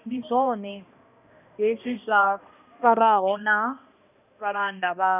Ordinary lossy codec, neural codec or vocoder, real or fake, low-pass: none; codec, 16 kHz, 1 kbps, X-Codec, HuBERT features, trained on balanced general audio; fake; 3.6 kHz